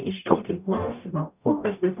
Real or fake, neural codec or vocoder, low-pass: fake; codec, 44.1 kHz, 0.9 kbps, DAC; 3.6 kHz